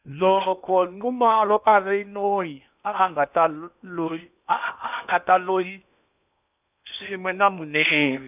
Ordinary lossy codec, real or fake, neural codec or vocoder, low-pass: none; fake; codec, 16 kHz in and 24 kHz out, 0.8 kbps, FocalCodec, streaming, 65536 codes; 3.6 kHz